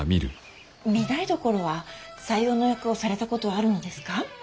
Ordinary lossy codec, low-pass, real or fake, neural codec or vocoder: none; none; real; none